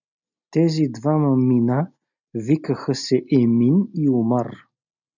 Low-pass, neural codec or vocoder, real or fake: 7.2 kHz; none; real